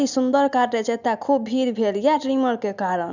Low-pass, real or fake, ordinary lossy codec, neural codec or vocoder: 7.2 kHz; real; none; none